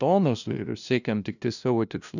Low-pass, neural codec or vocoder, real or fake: 7.2 kHz; codec, 16 kHz, 0.5 kbps, FunCodec, trained on LibriTTS, 25 frames a second; fake